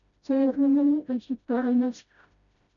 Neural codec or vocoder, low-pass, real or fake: codec, 16 kHz, 0.5 kbps, FreqCodec, smaller model; 7.2 kHz; fake